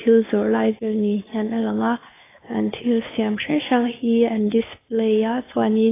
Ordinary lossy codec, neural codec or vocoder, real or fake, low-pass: AAC, 16 kbps; codec, 16 kHz, 2 kbps, FunCodec, trained on Chinese and English, 25 frames a second; fake; 3.6 kHz